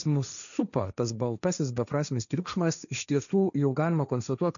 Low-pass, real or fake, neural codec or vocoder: 7.2 kHz; fake; codec, 16 kHz, 1.1 kbps, Voila-Tokenizer